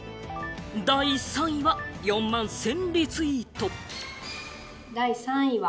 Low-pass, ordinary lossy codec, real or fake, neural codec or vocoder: none; none; real; none